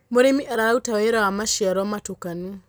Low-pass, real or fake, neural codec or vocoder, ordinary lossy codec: none; real; none; none